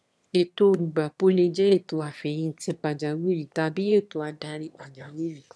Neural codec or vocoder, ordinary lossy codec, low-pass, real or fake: autoencoder, 22.05 kHz, a latent of 192 numbers a frame, VITS, trained on one speaker; none; none; fake